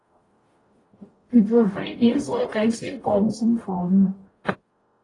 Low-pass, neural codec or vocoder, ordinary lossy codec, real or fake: 10.8 kHz; codec, 44.1 kHz, 0.9 kbps, DAC; AAC, 32 kbps; fake